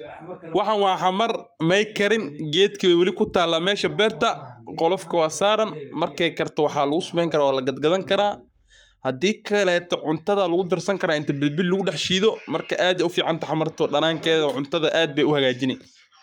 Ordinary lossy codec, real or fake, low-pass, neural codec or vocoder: none; fake; 19.8 kHz; codec, 44.1 kHz, 7.8 kbps, Pupu-Codec